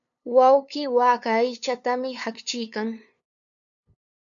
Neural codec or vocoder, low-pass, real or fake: codec, 16 kHz, 2 kbps, FunCodec, trained on LibriTTS, 25 frames a second; 7.2 kHz; fake